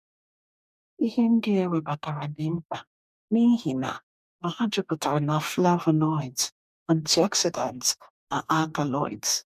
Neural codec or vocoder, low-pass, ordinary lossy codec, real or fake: codec, 44.1 kHz, 2.6 kbps, DAC; 14.4 kHz; none; fake